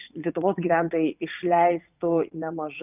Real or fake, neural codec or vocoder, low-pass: fake; vocoder, 44.1 kHz, 80 mel bands, Vocos; 3.6 kHz